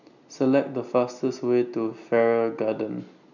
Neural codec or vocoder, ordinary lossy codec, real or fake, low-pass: none; none; real; 7.2 kHz